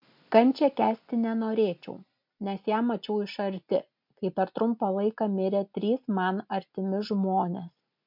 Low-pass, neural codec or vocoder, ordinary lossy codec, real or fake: 5.4 kHz; none; MP3, 48 kbps; real